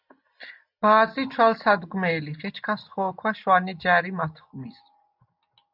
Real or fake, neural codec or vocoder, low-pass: real; none; 5.4 kHz